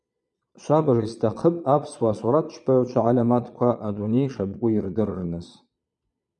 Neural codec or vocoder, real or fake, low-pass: vocoder, 22.05 kHz, 80 mel bands, Vocos; fake; 9.9 kHz